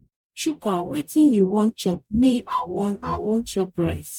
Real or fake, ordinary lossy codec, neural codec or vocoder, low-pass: fake; none; codec, 44.1 kHz, 0.9 kbps, DAC; 19.8 kHz